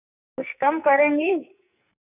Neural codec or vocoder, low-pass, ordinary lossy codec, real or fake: codec, 44.1 kHz, 2.6 kbps, SNAC; 3.6 kHz; none; fake